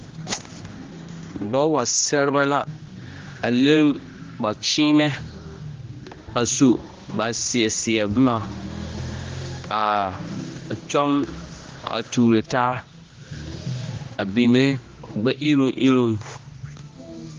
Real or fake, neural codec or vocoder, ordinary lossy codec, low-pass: fake; codec, 16 kHz, 1 kbps, X-Codec, HuBERT features, trained on general audio; Opus, 32 kbps; 7.2 kHz